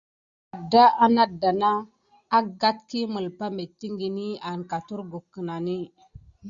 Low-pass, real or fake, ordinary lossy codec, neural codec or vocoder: 7.2 kHz; real; Opus, 64 kbps; none